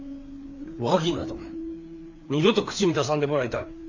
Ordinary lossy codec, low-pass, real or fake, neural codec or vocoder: AAC, 48 kbps; 7.2 kHz; fake; codec, 16 kHz, 4 kbps, FreqCodec, larger model